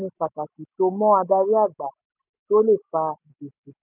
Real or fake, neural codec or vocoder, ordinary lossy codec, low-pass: real; none; none; 3.6 kHz